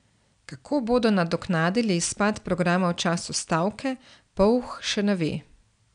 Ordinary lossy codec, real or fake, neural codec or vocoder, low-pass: none; real; none; 9.9 kHz